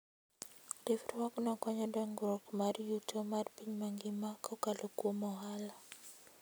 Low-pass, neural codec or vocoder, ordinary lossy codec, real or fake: none; none; none; real